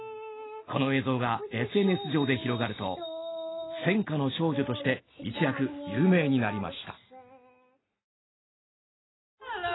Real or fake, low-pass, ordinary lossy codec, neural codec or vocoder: real; 7.2 kHz; AAC, 16 kbps; none